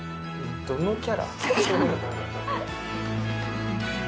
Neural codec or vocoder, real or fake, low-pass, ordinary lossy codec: none; real; none; none